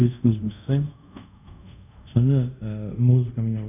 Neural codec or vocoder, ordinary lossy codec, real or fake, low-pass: codec, 24 kHz, 0.5 kbps, DualCodec; none; fake; 3.6 kHz